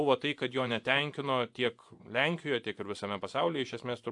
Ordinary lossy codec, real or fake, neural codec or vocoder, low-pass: AAC, 64 kbps; fake; vocoder, 24 kHz, 100 mel bands, Vocos; 10.8 kHz